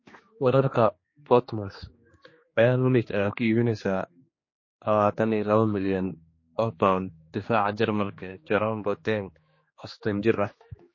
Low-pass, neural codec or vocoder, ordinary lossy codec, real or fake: 7.2 kHz; codec, 16 kHz, 2 kbps, X-Codec, HuBERT features, trained on general audio; MP3, 32 kbps; fake